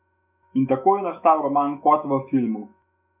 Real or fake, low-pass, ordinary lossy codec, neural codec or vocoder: fake; 3.6 kHz; none; autoencoder, 48 kHz, 128 numbers a frame, DAC-VAE, trained on Japanese speech